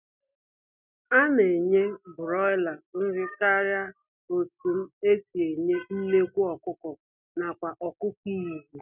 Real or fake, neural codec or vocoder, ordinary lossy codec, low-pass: real; none; none; 3.6 kHz